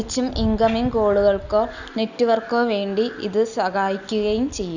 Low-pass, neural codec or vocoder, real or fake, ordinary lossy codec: 7.2 kHz; none; real; none